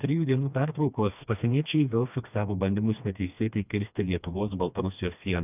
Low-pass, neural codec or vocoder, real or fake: 3.6 kHz; codec, 16 kHz, 2 kbps, FreqCodec, smaller model; fake